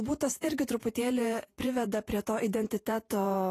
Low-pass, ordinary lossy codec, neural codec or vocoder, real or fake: 14.4 kHz; AAC, 48 kbps; vocoder, 48 kHz, 128 mel bands, Vocos; fake